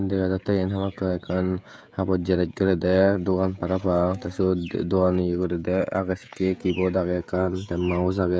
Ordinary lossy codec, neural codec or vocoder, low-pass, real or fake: none; codec, 16 kHz, 16 kbps, FreqCodec, smaller model; none; fake